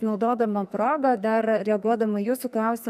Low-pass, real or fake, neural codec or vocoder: 14.4 kHz; fake; codec, 44.1 kHz, 2.6 kbps, SNAC